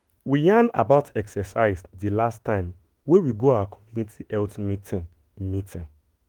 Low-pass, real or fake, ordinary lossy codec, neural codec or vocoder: 19.8 kHz; fake; Opus, 24 kbps; autoencoder, 48 kHz, 32 numbers a frame, DAC-VAE, trained on Japanese speech